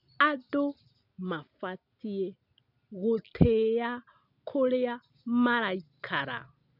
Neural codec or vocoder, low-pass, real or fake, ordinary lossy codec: none; 5.4 kHz; real; none